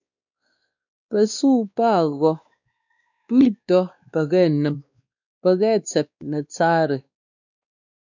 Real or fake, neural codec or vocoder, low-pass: fake; codec, 16 kHz, 2 kbps, X-Codec, WavLM features, trained on Multilingual LibriSpeech; 7.2 kHz